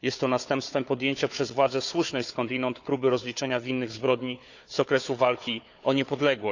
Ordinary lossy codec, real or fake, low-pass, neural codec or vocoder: none; fake; 7.2 kHz; codec, 16 kHz, 4 kbps, FunCodec, trained on Chinese and English, 50 frames a second